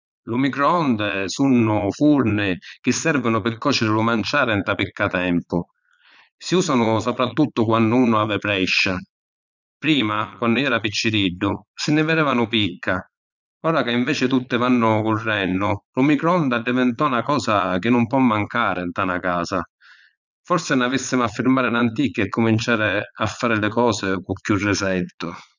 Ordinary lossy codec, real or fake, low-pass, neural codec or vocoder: none; fake; 7.2 kHz; vocoder, 22.05 kHz, 80 mel bands, Vocos